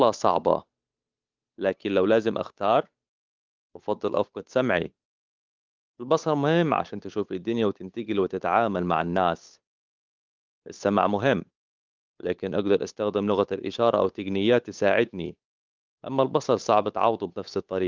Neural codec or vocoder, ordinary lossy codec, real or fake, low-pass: codec, 16 kHz, 8 kbps, FunCodec, trained on Chinese and English, 25 frames a second; Opus, 32 kbps; fake; 7.2 kHz